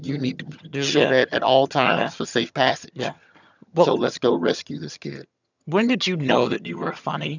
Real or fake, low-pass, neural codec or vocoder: fake; 7.2 kHz; vocoder, 22.05 kHz, 80 mel bands, HiFi-GAN